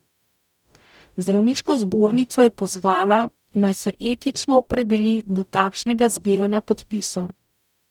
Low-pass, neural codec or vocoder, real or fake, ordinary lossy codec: 19.8 kHz; codec, 44.1 kHz, 0.9 kbps, DAC; fake; none